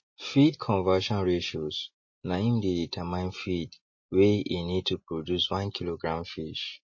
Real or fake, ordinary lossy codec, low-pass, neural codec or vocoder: real; MP3, 32 kbps; 7.2 kHz; none